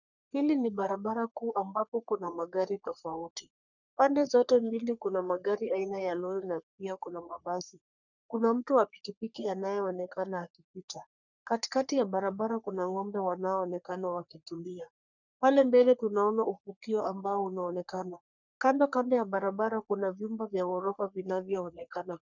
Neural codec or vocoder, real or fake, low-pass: codec, 44.1 kHz, 3.4 kbps, Pupu-Codec; fake; 7.2 kHz